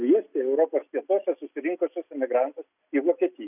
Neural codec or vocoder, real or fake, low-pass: none; real; 3.6 kHz